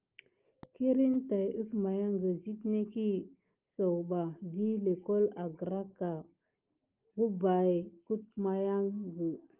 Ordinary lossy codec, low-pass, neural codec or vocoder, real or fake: Opus, 24 kbps; 3.6 kHz; none; real